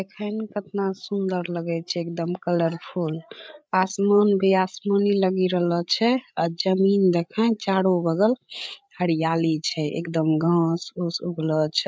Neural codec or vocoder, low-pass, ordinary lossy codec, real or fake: codec, 16 kHz, 8 kbps, FreqCodec, larger model; none; none; fake